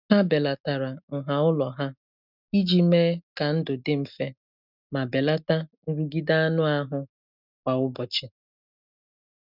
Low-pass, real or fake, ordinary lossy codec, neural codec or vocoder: 5.4 kHz; real; none; none